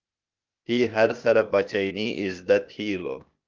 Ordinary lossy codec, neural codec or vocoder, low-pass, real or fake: Opus, 24 kbps; codec, 16 kHz, 0.8 kbps, ZipCodec; 7.2 kHz; fake